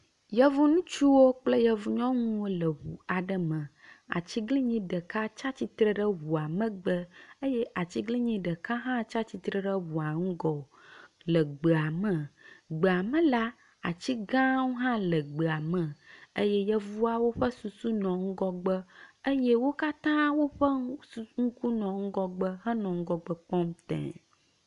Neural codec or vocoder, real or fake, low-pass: none; real; 10.8 kHz